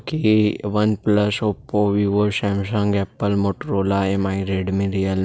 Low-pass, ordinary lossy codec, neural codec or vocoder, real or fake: none; none; none; real